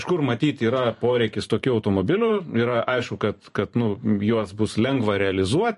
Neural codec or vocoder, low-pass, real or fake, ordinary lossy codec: vocoder, 44.1 kHz, 128 mel bands every 512 samples, BigVGAN v2; 14.4 kHz; fake; MP3, 48 kbps